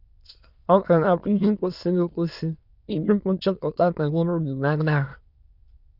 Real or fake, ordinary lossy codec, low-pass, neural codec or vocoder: fake; Opus, 64 kbps; 5.4 kHz; autoencoder, 22.05 kHz, a latent of 192 numbers a frame, VITS, trained on many speakers